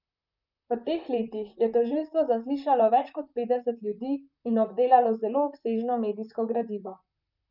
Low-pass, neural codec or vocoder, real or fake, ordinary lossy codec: 5.4 kHz; vocoder, 44.1 kHz, 128 mel bands, Pupu-Vocoder; fake; none